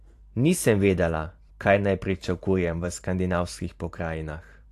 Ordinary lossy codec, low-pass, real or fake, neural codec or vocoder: AAC, 64 kbps; 14.4 kHz; fake; vocoder, 44.1 kHz, 128 mel bands every 512 samples, BigVGAN v2